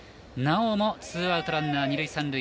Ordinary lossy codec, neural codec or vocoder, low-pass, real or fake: none; none; none; real